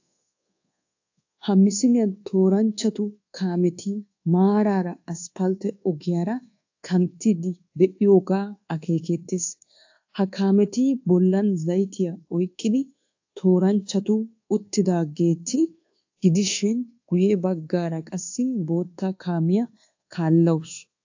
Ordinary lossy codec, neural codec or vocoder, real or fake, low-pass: AAC, 48 kbps; codec, 24 kHz, 1.2 kbps, DualCodec; fake; 7.2 kHz